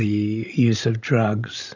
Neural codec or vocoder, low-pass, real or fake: codec, 16 kHz, 16 kbps, FreqCodec, larger model; 7.2 kHz; fake